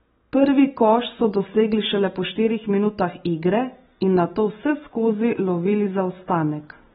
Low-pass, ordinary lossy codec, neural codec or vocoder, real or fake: 19.8 kHz; AAC, 16 kbps; none; real